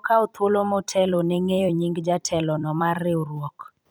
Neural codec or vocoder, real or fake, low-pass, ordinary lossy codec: vocoder, 44.1 kHz, 128 mel bands every 256 samples, BigVGAN v2; fake; none; none